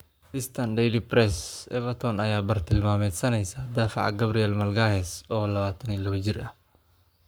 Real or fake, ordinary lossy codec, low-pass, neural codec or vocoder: fake; none; none; codec, 44.1 kHz, 7.8 kbps, Pupu-Codec